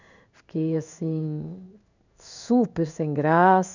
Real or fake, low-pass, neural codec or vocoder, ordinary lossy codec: fake; 7.2 kHz; codec, 16 kHz in and 24 kHz out, 1 kbps, XY-Tokenizer; none